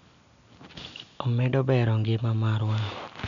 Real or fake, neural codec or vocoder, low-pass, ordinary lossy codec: real; none; 7.2 kHz; none